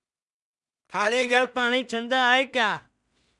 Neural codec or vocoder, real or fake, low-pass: codec, 16 kHz in and 24 kHz out, 0.4 kbps, LongCat-Audio-Codec, two codebook decoder; fake; 10.8 kHz